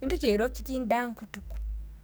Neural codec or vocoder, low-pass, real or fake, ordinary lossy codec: codec, 44.1 kHz, 2.6 kbps, SNAC; none; fake; none